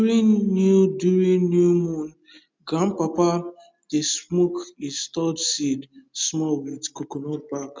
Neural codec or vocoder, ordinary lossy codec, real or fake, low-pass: none; none; real; none